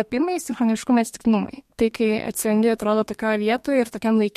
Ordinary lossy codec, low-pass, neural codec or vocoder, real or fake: MP3, 64 kbps; 14.4 kHz; codec, 32 kHz, 1.9 kbps, SNAC; fake